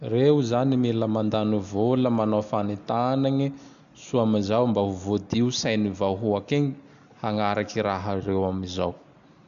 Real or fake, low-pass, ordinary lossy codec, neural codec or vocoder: real; 7.2 kHz; none; none